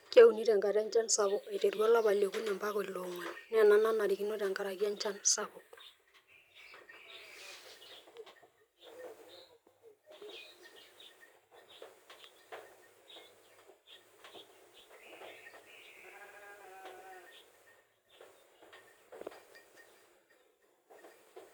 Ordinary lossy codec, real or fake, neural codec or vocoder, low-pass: none; fake; vocoder, 44.1 kHz, 128 mel bands every 256 samples, BigVGAN v2; none